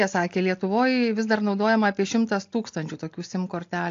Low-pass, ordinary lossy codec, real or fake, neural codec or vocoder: 7.2 kHz; AAC, 48 kbps; real; none